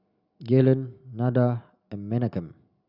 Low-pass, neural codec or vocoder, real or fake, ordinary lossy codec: 5.4 kHz; none; real; Opus, 64 kbps